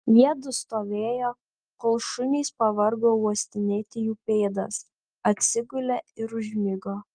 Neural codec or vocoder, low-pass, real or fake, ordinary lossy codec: none; 9.9 kHz; real; Opus, 24 kbps